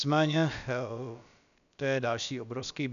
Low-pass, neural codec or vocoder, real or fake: 7.2 kHz; codec, 16 kHz, about 1 kbps, DyCAST, with the encoder's durations; fake